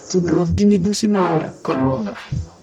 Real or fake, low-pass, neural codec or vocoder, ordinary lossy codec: fake; 19.8 kHz; codec, 44.1 kHz, 0.9 kbps, DAC; none